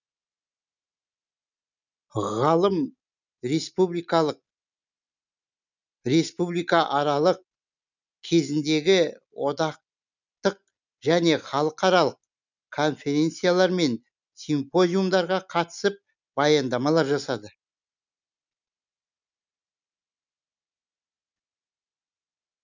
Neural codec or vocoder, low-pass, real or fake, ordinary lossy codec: none; 7.2 kHz; real; none